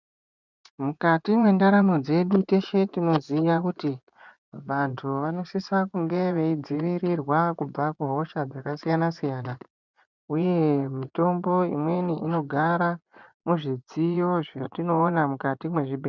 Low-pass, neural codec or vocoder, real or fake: 7.2 kHz; vocoder, 24 kHz, 100 mel bands, Vocos; fake